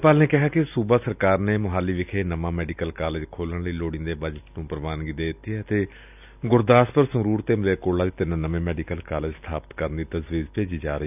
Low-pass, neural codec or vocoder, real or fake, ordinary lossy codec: 3.6 kHz; none; real; none